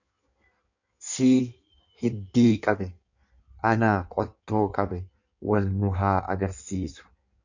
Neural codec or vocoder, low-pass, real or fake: codec, 16 kHz in and 24 kHz out, 1.1 kbps, FireRedTTS-2 codec; 7.2 kHz; fake